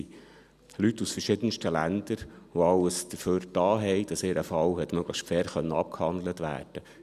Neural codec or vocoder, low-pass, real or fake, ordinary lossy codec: none; 14.4 kHz; real; none